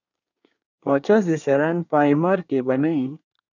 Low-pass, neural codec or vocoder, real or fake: 7.2 kHz; codec, 24 kHz, 1 kbps, SNAC; fake